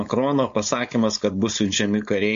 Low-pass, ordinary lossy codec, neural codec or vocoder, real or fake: 7.2 kHz; MP3, 48 kbps; codec, 16 kHz, 4.8 kbps, FACodec; fake